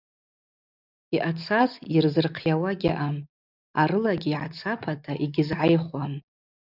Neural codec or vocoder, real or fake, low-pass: none; real; 5.4 kHz